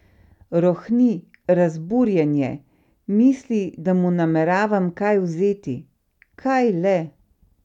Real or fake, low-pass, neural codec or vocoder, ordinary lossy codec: real; 19.8 kHz; none; none